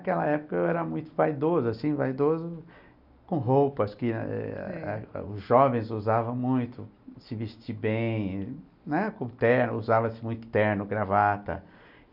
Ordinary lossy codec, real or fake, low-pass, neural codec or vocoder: none; real; 5.4 kHz; none